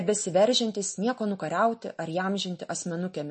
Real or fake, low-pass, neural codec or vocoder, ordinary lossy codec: real; 10.8 kHz; none; MP3, 32 kbps